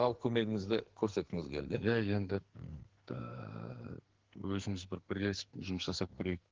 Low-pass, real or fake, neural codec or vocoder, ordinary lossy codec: 7.2 kHz; fake; codec, 44.1 kHz, 2.6 kbps, SNAC; Opus, 16 kbps